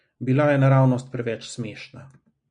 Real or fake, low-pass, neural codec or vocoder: real; 9.9 kHz; none